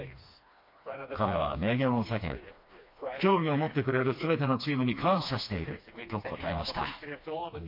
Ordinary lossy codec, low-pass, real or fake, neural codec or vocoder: MP3, 32 kbps; 5.4 kHz; fake; codec, 16 kHz, 2 kbps, FreqCodec, smaller model